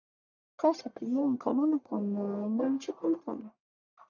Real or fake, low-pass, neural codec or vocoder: fake; 7.2 kHz; codec, 44.1 kHz, 1.7 kbps, Pupu-Codec